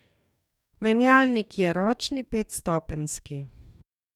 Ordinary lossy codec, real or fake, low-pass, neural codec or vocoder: none; fake; 19.8 kHz; codec, 44.1 kHz, 2.6 kbps, DAC